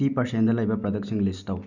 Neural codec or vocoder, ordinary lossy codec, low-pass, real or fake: none; none; 7.2 kHz; real